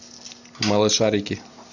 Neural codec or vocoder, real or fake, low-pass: none; real; 7.2 kHz